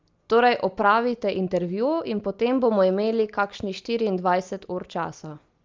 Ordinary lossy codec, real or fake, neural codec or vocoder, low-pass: Opus, 32 kbps; real; none; 7.2 kHz